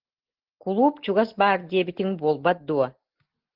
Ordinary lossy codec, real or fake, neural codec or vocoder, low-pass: Opus, 16 kbps; real; none; 5.4 kHz